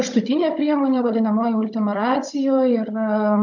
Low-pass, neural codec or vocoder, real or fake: 7.2 kHz; codec, 16 kHz, 16 kbps, FunCodec, trained on Chinese and English, 50 frames a second; fake